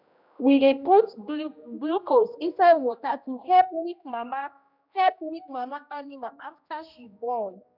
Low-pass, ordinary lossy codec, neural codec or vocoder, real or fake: 5.4 kHz; none; codec, 16 kHz, 1 kbps, X-Codec, HuBERT features, trained on general audio; fake